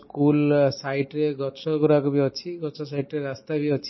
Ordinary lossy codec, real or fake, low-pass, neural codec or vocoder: MP3, 24 kbps; real; 7.2 kHz; none